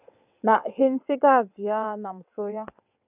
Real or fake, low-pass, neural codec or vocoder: fake; 3.6 kHz; vocoder, 22.05 kHz, 80 mel bands, WaveNeXt